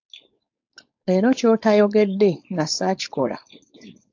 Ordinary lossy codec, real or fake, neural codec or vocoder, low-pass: AAC, 48 kbps; fake; codec, 16 kHz, 4.8 kbps, FACodec; 7.2 kHz